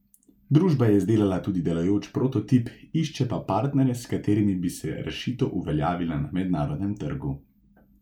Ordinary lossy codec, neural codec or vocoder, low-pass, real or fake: none; none; 19.8 kHz; real